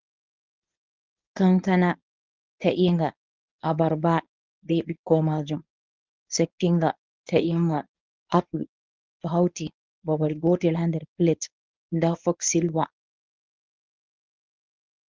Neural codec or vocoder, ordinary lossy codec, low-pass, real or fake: codec, 24 kHz, 0.9 kbps, WavTokenizer, medium speech release version 1; Opus, 32 kbps; 7.2 kHz; fake